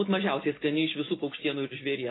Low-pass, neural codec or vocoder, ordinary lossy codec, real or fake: 7.2 kHz; none; AAC, 16 kbps; real